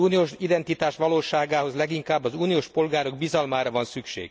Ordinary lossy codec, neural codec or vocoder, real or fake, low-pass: none; none; real; none